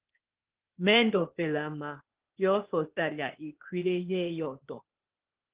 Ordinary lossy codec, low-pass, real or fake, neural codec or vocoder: Opus, 16 kbps; 3.6 kHz; fake; codec, 16 kHz, 0.8 kbps, ZipCodec